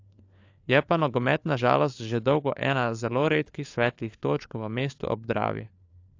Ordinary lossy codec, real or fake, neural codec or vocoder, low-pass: AAC, 48 kbps; fake; codec, 16 kHz, 4 kbps, FunCodec, trained on LibriTTS, 50 frames a second; 7.2 kHz